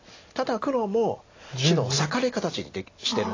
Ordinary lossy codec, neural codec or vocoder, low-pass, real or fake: AAC, 32 kbps; none; 7.2 kHz; real